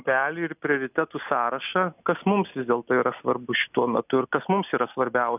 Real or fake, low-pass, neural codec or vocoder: real; 3.6 kHz; none